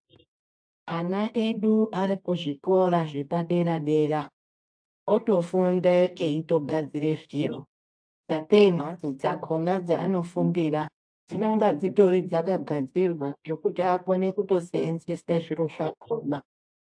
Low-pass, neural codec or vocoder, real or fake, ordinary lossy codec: 9.9 kHz; codec, 24 kHz, 0.9 kbps, WavTokenizer, medium music audio release; fake; AAC, 64 kbps